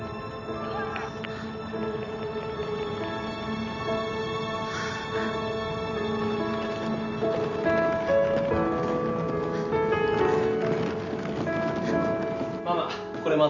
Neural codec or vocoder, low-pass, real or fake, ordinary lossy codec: none; 7.2 kHz; real; none